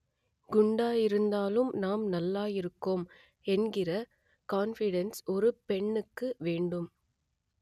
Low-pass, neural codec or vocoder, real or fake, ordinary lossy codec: 14.4 kHz; none; real; none